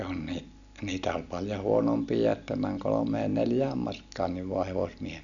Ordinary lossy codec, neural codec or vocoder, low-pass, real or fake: none; none; 7.2 kHz; real